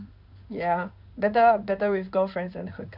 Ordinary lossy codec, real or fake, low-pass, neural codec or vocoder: none; fake; 5.4 kHz; codec, 16 kHz in and 24 kHz out, 1 kbps, XY-Tokenizer